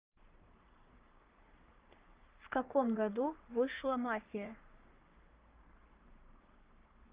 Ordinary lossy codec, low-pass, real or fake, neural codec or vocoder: Opus, 32 kbps; 3.6 kHz; fake; codec, 44.1 kHz, 3.4 kbps, Pupu-Codec